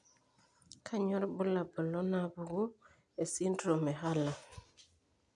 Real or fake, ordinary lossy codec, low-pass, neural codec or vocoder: real; none; 10.8 kHz; none